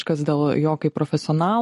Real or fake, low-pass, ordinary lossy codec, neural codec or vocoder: real; 14.4 kHz; MP3, 48 kbps; none